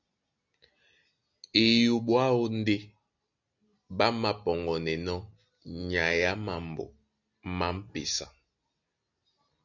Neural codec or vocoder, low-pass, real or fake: none; 7.2 kHz; real